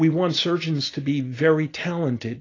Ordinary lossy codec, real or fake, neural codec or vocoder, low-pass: AAC, 32 kbps; real; none; 7.2 kHz